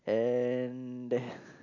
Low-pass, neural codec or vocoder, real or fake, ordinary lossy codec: 7.2 kHz; none; real; none